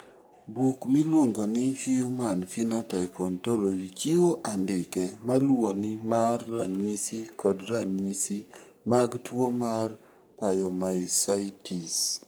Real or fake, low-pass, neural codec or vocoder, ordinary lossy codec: fake; none; codec, 44.1 kHz, 3.4 kbps, Pupu-Codec; none